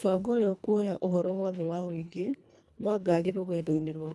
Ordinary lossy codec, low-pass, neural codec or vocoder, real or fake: none; none; codec, 24 kHz, 1.5 kbps, HILCodec; fake